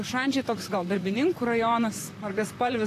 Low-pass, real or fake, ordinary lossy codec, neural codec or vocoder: 14.4 kHz; fake; AAC, 48 kbps; vocoder, 44.1 kHz, 128 mel bands, Pupu-Vocoder